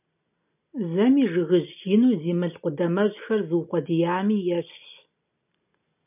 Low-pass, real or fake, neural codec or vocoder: 3.6 kHz; real; none